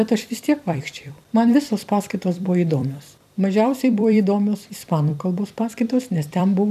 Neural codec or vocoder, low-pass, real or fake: vocoder, 44.1 kHz, 128 mel bands every 256 samples, BigVGAN v2; 14.4 kHz; fake